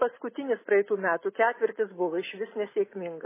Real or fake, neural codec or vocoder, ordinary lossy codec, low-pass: real; none; MP3, 16 kbps; 3.6 kHz